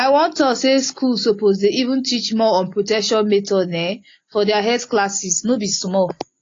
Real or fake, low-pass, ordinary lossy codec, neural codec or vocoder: real; 7.2 kHz; AAC, 32 kbps; none